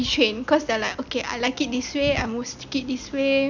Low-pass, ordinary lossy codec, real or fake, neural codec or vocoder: 7.2 kHz; none; real; none